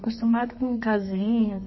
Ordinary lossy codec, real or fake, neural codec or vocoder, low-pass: MP3, 24 kbps; fake; codec, 32 kHz, 1.9 kbps, SNAC; 7.2 kHz